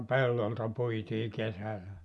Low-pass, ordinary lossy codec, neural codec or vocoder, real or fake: none; none; none; real